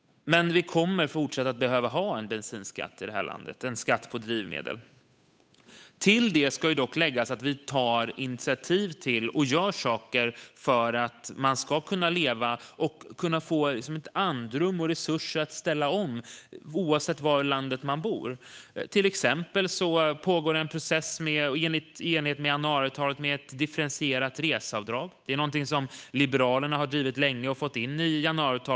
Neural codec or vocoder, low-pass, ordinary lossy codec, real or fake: codec, 16 kHz, 8 kbps, FunCodec, trained on Chinese and English, 25 frames a second; none; none; fake